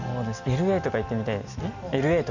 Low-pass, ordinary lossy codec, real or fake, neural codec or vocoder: 7.2 kHz; none; real; none